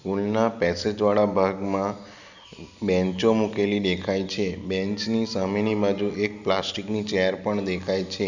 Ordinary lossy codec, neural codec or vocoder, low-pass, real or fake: none; none; 7.2 kHz; real